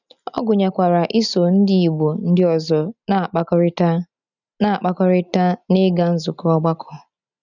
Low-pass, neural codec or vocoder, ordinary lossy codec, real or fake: 7.2 kHz; none; none; real